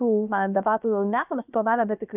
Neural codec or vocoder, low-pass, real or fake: codec, 16 kHz, about 1 kbps, DyCAST, with the encoder's durations; 3.6 kHz; fake